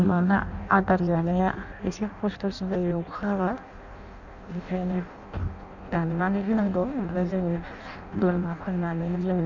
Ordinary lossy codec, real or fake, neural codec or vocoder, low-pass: none; fake; codec, 16 kHz in and 24 kHz out, 0.6 kbps, FireRedTTS-2 codec; 7.2 kHz